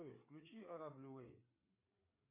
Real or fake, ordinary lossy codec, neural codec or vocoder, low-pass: fake; MP3, 24 kbps; codec, 16 kHz, 4 kbps, FreqCodec, larger model; 3.6 kHz